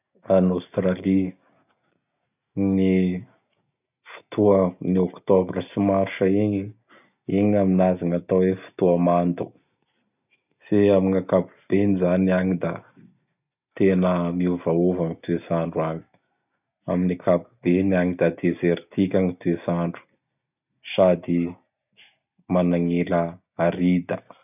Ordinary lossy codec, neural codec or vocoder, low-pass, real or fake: none; none; 3.6 kHz; real